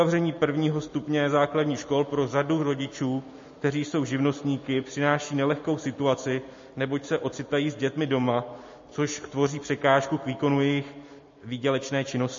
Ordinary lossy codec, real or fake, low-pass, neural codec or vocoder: MP3, 32 kbps; real; 7.2 kHz; none